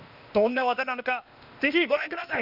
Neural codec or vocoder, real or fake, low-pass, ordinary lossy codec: codec, 16 kHz, 0.8 kbps, ZipCodec; fake; 5.4 kHz; none